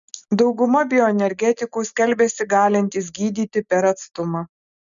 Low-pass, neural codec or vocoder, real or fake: 7.2 kHz; none; real